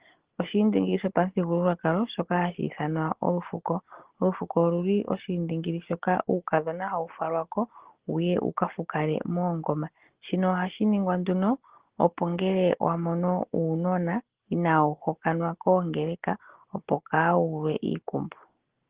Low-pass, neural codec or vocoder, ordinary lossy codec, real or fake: 3.6 kHz; none; Opus, 16 kbps; real